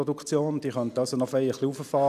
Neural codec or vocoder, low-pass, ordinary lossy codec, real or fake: none; 14.4 kHz; none; real